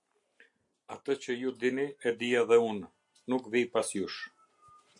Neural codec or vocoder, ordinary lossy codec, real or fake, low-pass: none; MP3, 64 kbps; real; 10.8 kHz